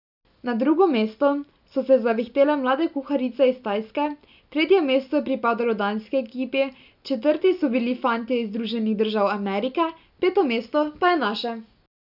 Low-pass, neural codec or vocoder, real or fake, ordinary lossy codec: 5.4 kHz; none; real; none